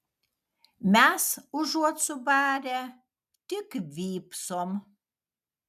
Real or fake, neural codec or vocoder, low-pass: real; none; 14.4 kHz